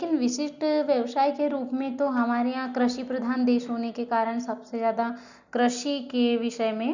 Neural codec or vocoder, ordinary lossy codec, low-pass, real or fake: none; none; 7.2 kHz; real